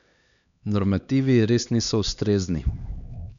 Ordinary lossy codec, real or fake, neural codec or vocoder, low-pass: none; fake; codec, 16 kHz, 2 kbps, X-Codec, HuBERT features, trained on LibriSpeech; 7.2 kHz